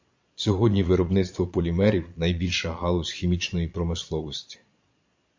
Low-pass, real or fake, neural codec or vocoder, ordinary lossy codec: 7.2 kHz; fake; vocoder, 22.05 kHz, 80 mel bands, Vocos; MP3, 48 kbps